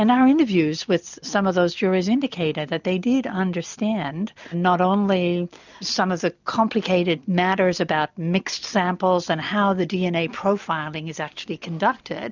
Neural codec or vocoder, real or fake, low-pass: none; real; 7.2 kHz